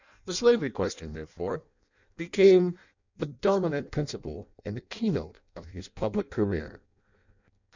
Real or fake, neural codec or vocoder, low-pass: fake; codec, 16 kHz in and 24 kHz out, 0.6 kbps, FireRedTTS-2 codec; 7.2 kHz